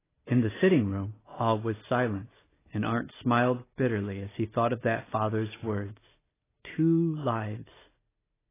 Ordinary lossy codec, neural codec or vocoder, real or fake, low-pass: AAC, 16 kbps; none; real; 3.6 kHz